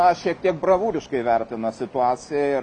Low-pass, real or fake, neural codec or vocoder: 10.8 kHz; real; none